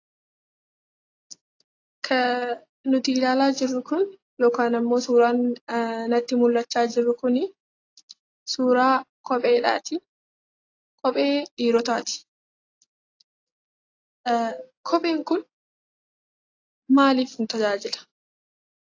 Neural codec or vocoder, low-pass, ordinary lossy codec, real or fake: none; 7.2 kHz; AAC, 32 kbps; real